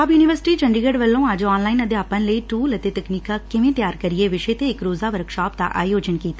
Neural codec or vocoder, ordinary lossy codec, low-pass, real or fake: none; none; none; real